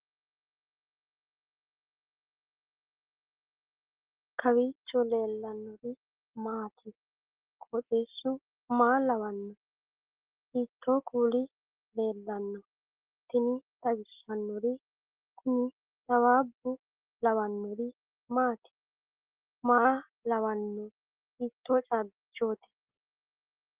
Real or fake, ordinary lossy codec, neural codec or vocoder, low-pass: real; Opus, 16 kbps; none; 3.6 kHz